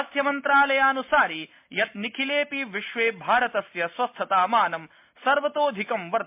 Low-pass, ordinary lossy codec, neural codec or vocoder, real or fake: 3.6 kHz; MP3, 32 kbps; none; real